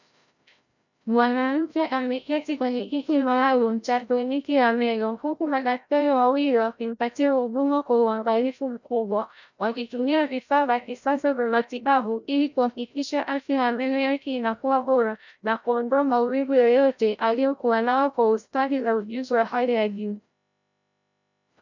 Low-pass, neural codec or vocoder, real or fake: 7.2 kHz; codec, 16 kHz, 0.5 kbps, FreqCodec, larger model; fake